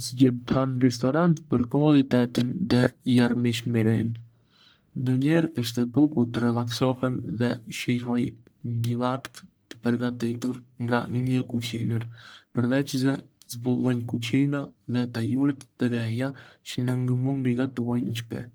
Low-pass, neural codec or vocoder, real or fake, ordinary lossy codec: none; codec, 44.1 kHz, 1.7 kbps, Pupu-Codec; fake; none